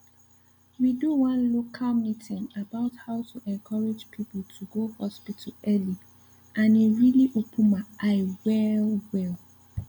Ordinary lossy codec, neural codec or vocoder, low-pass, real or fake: none; none; 19.8 kHz; real